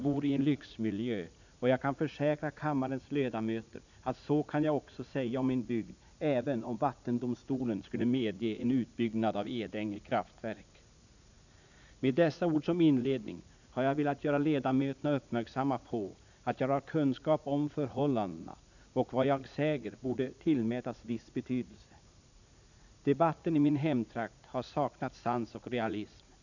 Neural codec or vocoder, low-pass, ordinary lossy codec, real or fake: vocoder, 44.1 kHz, 80 mel bands, Vocos; 7.2 kHz; none; fake